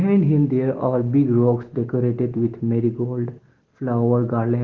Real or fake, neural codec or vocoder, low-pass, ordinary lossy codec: real; none; 7.2 kHz; Opus, 16 kbps